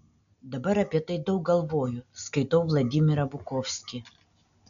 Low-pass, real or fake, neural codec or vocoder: 7.2 kHz; real; none